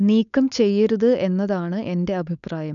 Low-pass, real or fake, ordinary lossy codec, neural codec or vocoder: 7.2 kHz; fake; none; codec, 16 kHz, 4.8 kbps, FACodec